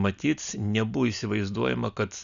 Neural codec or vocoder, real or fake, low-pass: none; real; 7.2 kHz